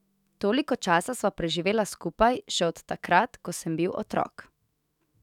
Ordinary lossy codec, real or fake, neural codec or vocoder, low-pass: none; fake; autoencoder, 48 kHz, 128 numbers a frame, DAC-VAE, trained on Japanese speech; 19.8 kHz